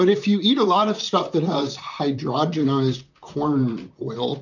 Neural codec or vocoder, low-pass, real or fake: vocoder, 44.1 kHz, 128 mel bands, Pupu-Vocoder; 7.2 kHz; fake